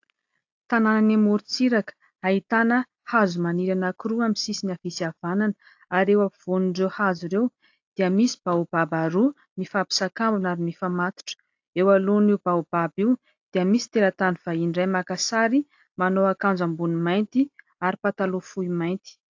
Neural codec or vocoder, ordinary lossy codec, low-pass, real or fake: none; AAC, 48 kbps; 7.2 kHz; real